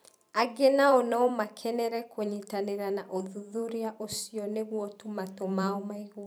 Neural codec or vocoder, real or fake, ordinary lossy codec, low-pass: vocoder, 44.1 kHz, 128 mel bands every 512 samples, BigVGAN v2; fake; none; none